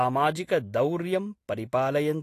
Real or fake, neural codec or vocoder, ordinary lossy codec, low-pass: real; none; AAC, 48 kbps; 14.4 kHz